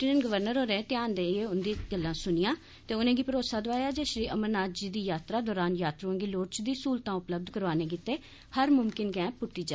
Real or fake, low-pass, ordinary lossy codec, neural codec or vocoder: real; none; none; none